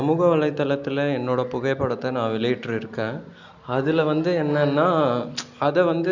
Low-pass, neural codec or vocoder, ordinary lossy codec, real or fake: 7.2 kHz; none; none; real